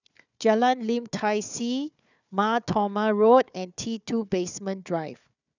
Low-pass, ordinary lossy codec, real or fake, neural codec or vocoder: 7.2 kHz; none; fake; codec, 16 kHz, 4 kbps, FunCodec, trained on Chinese and English, 50 frames a second